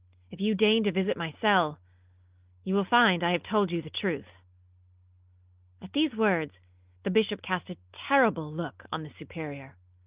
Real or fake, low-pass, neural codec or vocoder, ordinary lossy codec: real; 3.6 kHz; none; Opus, 32 kbps